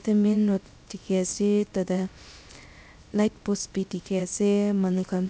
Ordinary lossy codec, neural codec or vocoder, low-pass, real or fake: none; codec, 16 kHz, 0.3 kbps, FocalCodec; none; fake